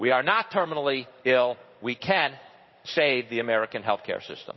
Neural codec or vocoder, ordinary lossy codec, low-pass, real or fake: codec, 16 kHz in and 24 kHz out, 1 kbps, XY-Tokenizer; MP3, 24 kbps; 7.2 kHz; fake